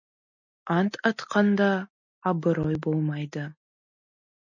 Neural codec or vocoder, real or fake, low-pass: none; real; 7.2 kHz